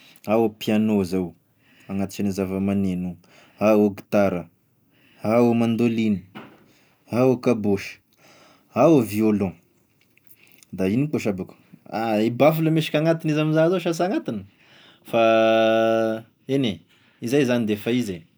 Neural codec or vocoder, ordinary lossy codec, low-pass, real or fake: none; none; none; real